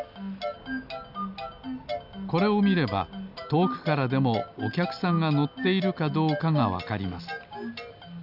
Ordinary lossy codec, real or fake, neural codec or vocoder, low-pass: none; real; none; 5.4 kHz